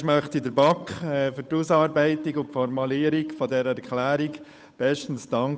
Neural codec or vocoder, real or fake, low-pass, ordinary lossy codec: codec, 16 kHz, 8 kbps, FunCodec, trained on Chinese and English, 25 frames a second; fake; none; none